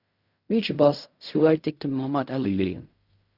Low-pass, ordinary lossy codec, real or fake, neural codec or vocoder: 5.4 kHz; Opus, 64 kbps; fake; codec, 16 kHz in and 24 kHz out, 0.4 kbps, LongCat-Audio-Codec, fine tuned four codebook decoder